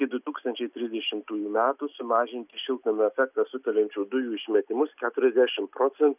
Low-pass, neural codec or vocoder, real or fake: 3.6 kHz; none; real